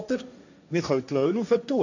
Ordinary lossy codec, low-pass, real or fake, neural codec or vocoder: none; 7.2 kHz; fake; codec, 16 kHz, 1.1 kbps, Voila-Tokenizer